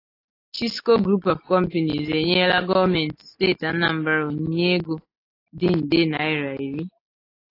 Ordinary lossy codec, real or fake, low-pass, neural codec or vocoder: AAC, 32 kbps; real; 5.4 kHz; none